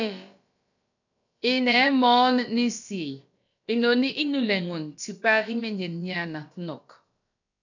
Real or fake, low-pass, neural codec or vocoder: fake; 7.2 kHz; codec, 16 kHz, about 1 kbps, DyCAST, with the encoder's durations